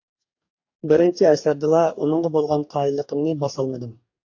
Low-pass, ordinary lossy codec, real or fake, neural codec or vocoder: 7.2 kHz; AAC, 48 kbps; fake; codec, 44.1 kHz, 2.6 kbps, DAC